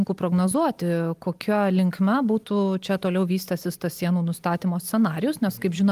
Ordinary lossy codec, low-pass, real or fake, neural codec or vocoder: Opus, 32 kbps; 14.4 kHz; real; none